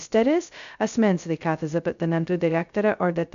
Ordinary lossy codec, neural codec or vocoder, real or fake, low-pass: MP3, 96 kbps; codec, 16 kHz, 0.2 kbps, FocalCodec; fake; 7.2 kHz